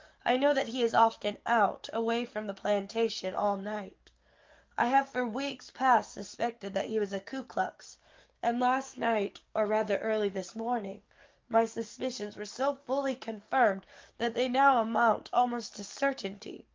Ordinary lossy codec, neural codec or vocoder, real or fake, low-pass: Opus, 24 kbps; codec, 44.1 kHz, 7.8 kbps, DAC; fake; 7.2 kHz